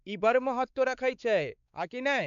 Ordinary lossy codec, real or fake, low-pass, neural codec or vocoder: none; fake; 7.2 kHz; codec, 16 kHz, 4 kbps, X-Codec, WavLM features, trained on Multilingual LibriSpeech